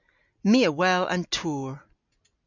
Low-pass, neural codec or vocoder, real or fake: 7.2 kHz; none; real